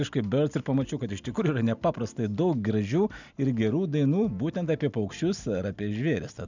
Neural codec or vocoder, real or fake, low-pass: none; real; 7.2 kHz